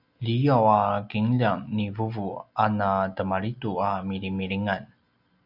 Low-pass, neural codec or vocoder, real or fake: 5.4 kHz; none; real